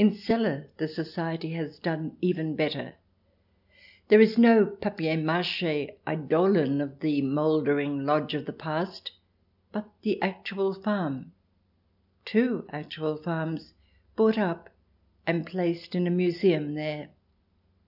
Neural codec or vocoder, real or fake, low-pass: none; real; 5.4 kHz